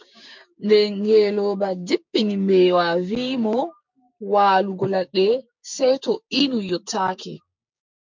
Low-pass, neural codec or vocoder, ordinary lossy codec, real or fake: 7.2 kHz; codec, 16 kHz, 6 kbps, DAC; MP3, 64 kbps; fake